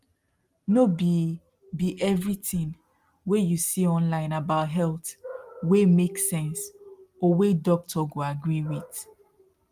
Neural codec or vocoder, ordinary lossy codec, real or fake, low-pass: none; Opus, 32 kbps; real; 14.4 kHz